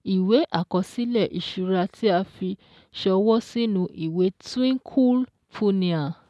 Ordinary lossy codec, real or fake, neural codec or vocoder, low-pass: none; real; none; none